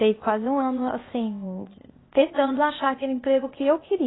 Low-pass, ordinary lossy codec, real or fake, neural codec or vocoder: 7.2 kHz; AAC, 16 kbps; fake; codec, 16 kHz, 0.8 kbps, ZipCodec